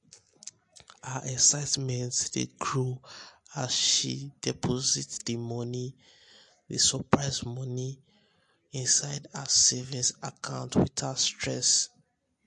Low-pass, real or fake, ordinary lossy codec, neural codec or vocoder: 10.8 kHz; real; MP3, 48 kbps; none